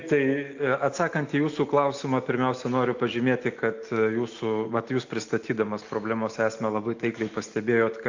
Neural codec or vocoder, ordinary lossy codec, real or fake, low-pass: none; AAC, 48 kbps; real; 7.2 kHz